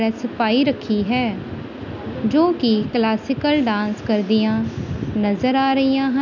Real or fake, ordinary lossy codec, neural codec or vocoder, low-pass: real; none; none; 7.2 kHz